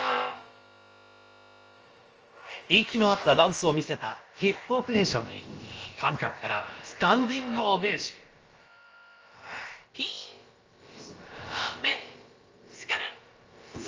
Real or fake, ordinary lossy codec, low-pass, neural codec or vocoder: fake; Opus, 24 kbps; 7.2 kHz; codec, 16 kHz, about 1 kbps, DyCAST, with the encoder's durations